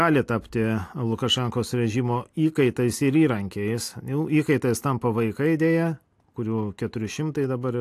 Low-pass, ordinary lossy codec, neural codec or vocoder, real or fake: 14.4 kHz; AAC, 64 kbps; none; real